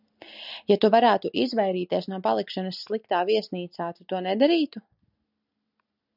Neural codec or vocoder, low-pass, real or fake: none; 5.4 kHz; real